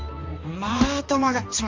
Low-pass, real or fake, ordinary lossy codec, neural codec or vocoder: 7.2 kHz; fake; Opus, 32 kbps; codec, 16 kHz, 2 kbps, X-Codec, HuBERT features, trained on balanced general audio